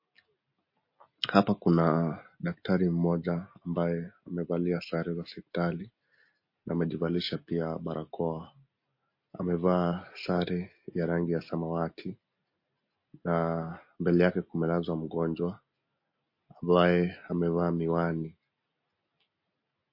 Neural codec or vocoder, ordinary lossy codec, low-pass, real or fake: none; MP3, 32 kbps; 5.4 kHz; real